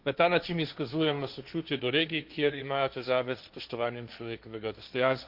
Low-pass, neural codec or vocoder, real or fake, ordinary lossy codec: 5.4 kHz; codec, 16 kHz, 1.1 kbps, Voila-Tokenizer; fake; none